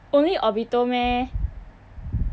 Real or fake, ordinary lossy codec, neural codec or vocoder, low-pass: real; none; none; none